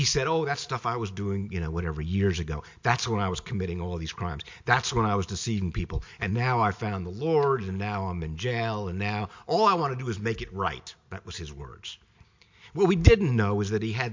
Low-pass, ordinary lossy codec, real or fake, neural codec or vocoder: 7.2 kHz; MP3, 48 kbps; real; none